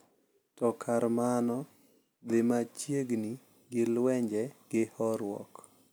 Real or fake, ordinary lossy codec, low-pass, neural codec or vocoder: real; none; none; none